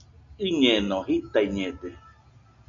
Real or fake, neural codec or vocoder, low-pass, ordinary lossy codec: real; none; 7.2 kHz; MP3, 64 kbps